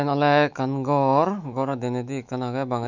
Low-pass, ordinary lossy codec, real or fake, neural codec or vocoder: 7.2 kHz; none; fake; autoencoder, 48 kHz, 128 numbers a frame, DAC-VAE, trained on Japanese speech